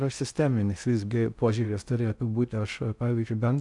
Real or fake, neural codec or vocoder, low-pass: fake; codec, 16 kHz in and 24 kHz out, 0.6 kbps, FocalCodec, streaming, 2048 codes; 10.8 kHz